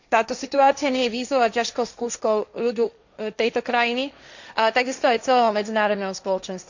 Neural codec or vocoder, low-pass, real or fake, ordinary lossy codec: codec, 16 kHz, 1.1 kbps, Voila-Tokenizer; 7.2 kHz; fake; none